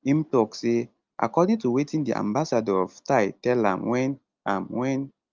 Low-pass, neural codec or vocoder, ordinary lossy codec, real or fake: 7.2 kHz; none; Opus, 32 kbps; real